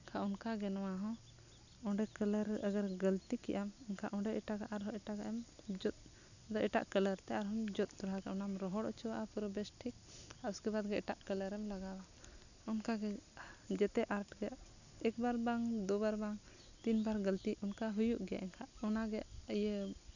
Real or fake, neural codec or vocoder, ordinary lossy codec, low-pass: real; none; none; 7.2 kHz